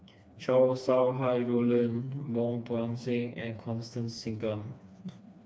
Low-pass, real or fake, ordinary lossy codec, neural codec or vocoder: none; fake; none; codec, 16 kHz, 2 kbps, FreqCodec, smaller model